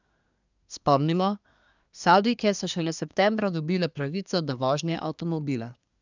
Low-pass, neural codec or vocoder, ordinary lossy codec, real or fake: 7.2 kHz; codec, 24 kHz, 1 kbps, SNAC; none; fake